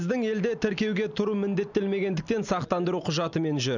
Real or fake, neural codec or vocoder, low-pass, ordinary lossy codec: real; none; 7.2 kHz; none